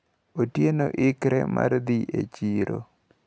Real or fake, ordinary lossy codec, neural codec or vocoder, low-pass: real; none; none; none